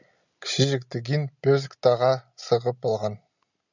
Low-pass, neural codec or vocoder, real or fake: 7.2 kHz; none; real